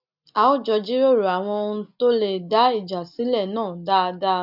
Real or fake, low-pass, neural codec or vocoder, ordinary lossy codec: real; 5.4 kHz; none; none